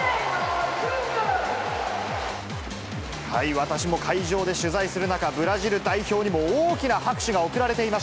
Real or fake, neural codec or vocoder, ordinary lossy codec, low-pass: real; none; none; none